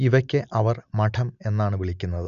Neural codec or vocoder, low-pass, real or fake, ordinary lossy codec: none; 7.2 kHz; real; none